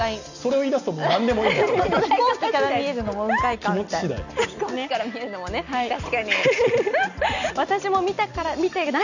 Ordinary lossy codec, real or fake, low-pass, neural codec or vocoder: none; real; 7.2 kHz; none